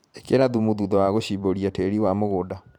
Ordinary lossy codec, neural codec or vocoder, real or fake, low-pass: none; vocoder, 44.1 kHz, 128 mel bands every 512 samples, BigVGAN v2; fake; 19.8 kHz